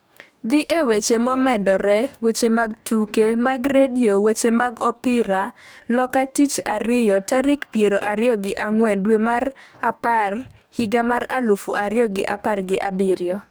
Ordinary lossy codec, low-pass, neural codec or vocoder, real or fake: none; none; codec, 44.1 kHz, 2.6 kbps, DAC; fake